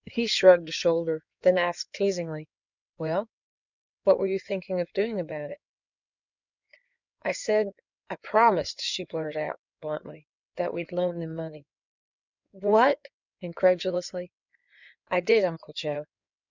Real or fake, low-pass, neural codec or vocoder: fake; 7.2 kHz; codec, 16 kHz in and 24 kHz out, 2.2 kbps, FireRedTTS-2 codec